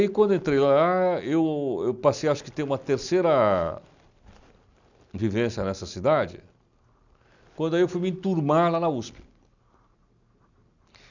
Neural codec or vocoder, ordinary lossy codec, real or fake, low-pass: none; none; real; 7.2 kHz